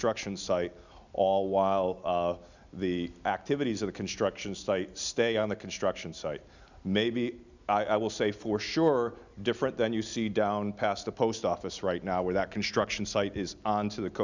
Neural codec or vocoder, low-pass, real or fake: none; 7.2 kHz; real